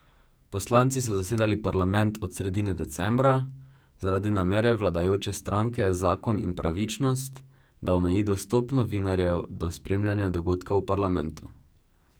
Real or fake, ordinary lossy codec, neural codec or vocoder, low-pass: fake; none; codec, 44.1 kHz, 2.6 kbps, SNAC; none